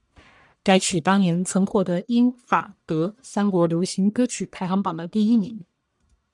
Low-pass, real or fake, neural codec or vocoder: 10.8 kHz; fake; codec, 44.1 kHz, 1.7 kbps, Pupu-Codec